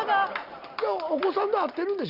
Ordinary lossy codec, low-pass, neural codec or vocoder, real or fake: Opus, 64 kbps; 5.4 kHz; none; real